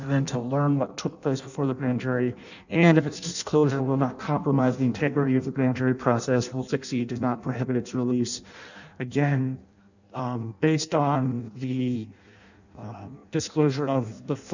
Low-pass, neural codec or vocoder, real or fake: 7.2 kHz; codec, 16 kHz in and 24 kHz out, 0.6 kbps, FireRedTTS-2 codec; fake